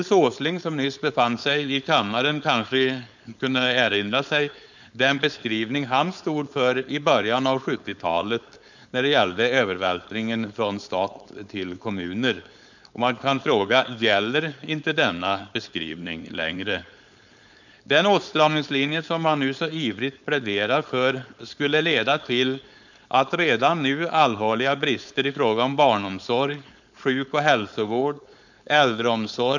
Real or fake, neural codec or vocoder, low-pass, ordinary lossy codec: fake; codec, 16 kHz, 4.8 kbps, FACodec; 7.2 kHz; none